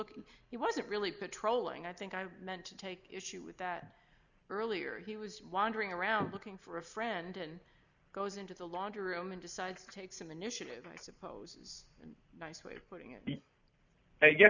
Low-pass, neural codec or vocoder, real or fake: 7.2 kHz; none; real